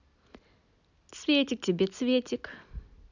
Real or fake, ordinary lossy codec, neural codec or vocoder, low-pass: real; none; none; 7.2 kHz